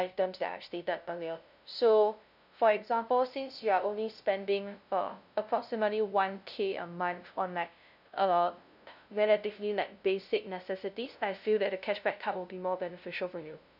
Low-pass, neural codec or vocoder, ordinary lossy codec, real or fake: 5.4 kHz; codec, 16 kHz, 0.5 kbps, FunCodec, trained on LibriTTS, 25 frames a second; none; fake